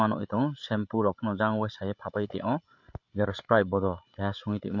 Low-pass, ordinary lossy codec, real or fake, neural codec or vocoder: 7.2 kHz; MP3, 48 kbps; fake; codec, 16 kHz, 8 kbps, FreqCodec, larger model